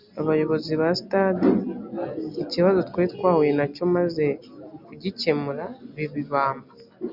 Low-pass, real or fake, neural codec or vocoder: 5.4 kHz; real; none